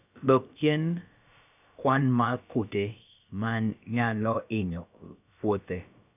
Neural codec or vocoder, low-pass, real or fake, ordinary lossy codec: codec, 16 kHz, about 1 kbps, DyCAST, with the encoder's durations; 3.6 kHz; fake; none